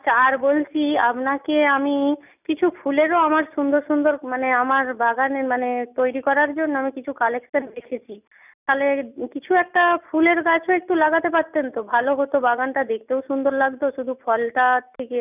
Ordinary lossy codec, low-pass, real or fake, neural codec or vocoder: none; 3.6 kHz; real; none